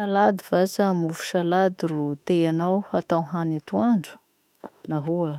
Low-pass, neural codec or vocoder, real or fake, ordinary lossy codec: 19.8 kHz; autoencoder, 48 kHz, 32 numbers a frame, DAC-VAE, trained on Japanese speech; fake; none